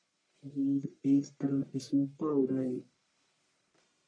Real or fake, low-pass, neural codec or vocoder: fake; 9.9 kHz; codec, 44.1 kHz, 1.7 kbps, Pupu-Codec